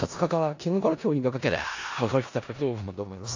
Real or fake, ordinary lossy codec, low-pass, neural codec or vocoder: fake; AAC, 48 kbps; 7.2 kHz; codec, 16 kHz in and 24 kHz out, 0.4 kbps, LongCat-Audio-Codec, four codebook decoder